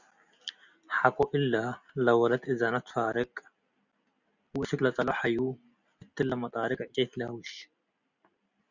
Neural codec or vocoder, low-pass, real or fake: none; 7.2 kHz; real